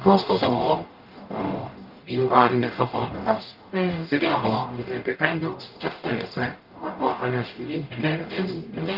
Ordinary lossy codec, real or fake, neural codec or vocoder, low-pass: Opus, 32 kbps; fake; codec, 44.1 kHz, 0.9 kbps, DAC; 5.4 kHz